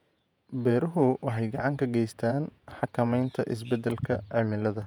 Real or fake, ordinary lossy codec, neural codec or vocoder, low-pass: fake; none; vocoder, 48 kHz, 128 mel bands, Vocos; 19.8 kHz